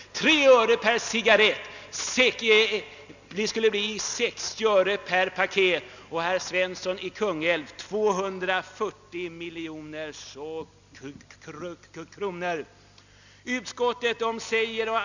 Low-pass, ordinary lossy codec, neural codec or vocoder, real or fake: 7.2 kHz; none; none; real